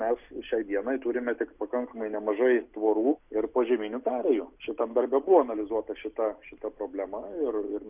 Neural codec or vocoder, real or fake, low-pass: none; real; 3.6 kHz